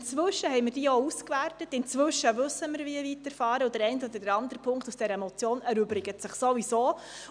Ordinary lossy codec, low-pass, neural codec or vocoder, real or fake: none; 9.9 kHz; none; real